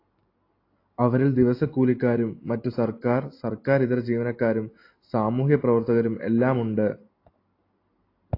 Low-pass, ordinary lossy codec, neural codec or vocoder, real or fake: 5.4 kHz; AAC, 32 kbps; none; real